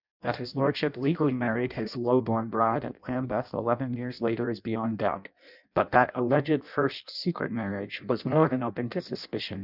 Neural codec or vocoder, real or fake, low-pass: codec, 16 kHz in and 24 kHz out, 0.6 kbps, FireRedTTS-2 codec; fake; 5.4 kHz